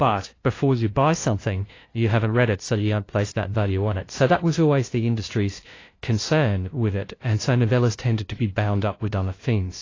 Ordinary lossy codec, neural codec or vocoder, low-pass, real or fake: AAC, 32 kbps; codec, 16 kHz, 0.5 kbps, FunCodec, trained on LibriTTS, 25 frames a second; 7.2 kHz; fake